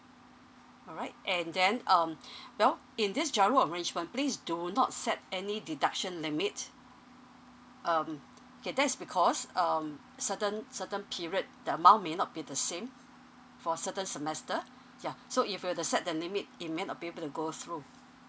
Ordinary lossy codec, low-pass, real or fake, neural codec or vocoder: none; none; real; none